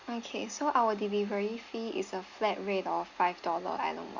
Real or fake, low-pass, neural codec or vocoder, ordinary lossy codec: real; 7.2 kHz; none; Opus, 64 kbps